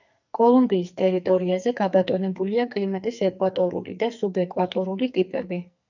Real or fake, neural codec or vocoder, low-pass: fake; codec, 44.1 kHz, 2.6 kbps, SNAC; 7.2 kHz